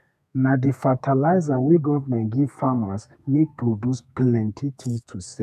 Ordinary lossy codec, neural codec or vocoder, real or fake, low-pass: none; codec, 32 kHz, 1.9 kbps, SNAC; fake; 14.4 kHz